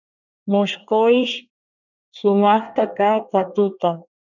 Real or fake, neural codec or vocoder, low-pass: fake; codec, 24 kHz, 1 kbps, SNAC; 7.2 kHz